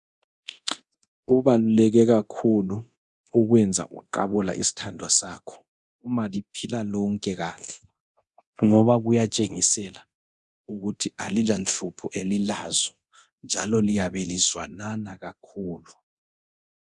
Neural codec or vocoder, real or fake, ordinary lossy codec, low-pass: codec, 24 kHz, 0.9 kbps, DualCodec; fake; Opus, 64 kbps; 10.8 kHz